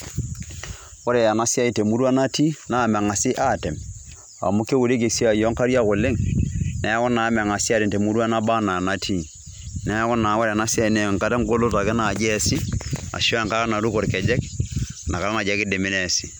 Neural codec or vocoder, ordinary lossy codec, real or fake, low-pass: none; none; real; none